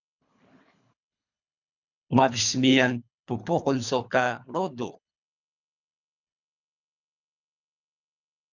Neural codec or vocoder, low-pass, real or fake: codec, 24 kHz, 3 kbps, HILCodec; 7.2 kHz; fake